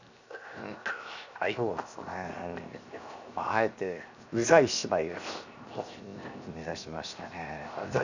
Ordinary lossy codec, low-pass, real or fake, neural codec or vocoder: none; 7.2 kHz; fake; codec, 16 kHz, 0.7 kbps, FocalCodec